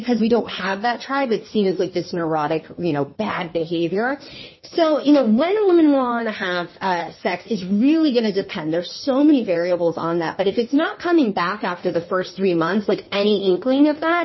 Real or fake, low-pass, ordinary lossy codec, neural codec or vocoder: fake; 7.2 kHz; MP3, 24 kbps; codec, 16 kHz in and 24 kHz out, 1.1 kbps, FireRedTTS-2 codec